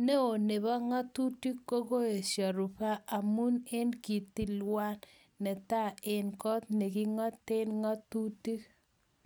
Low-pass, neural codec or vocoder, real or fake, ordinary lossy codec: none; none; real; none